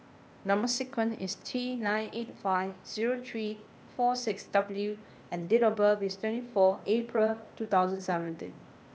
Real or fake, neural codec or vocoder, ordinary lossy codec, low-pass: fake; codec, 16 kHz, 0.8 kbps, ZipCodec; none; none